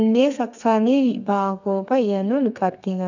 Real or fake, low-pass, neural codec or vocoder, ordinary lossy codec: fake; 7.2 kHz; codec, 32 kHz, 1.9 kbps, SNAC; none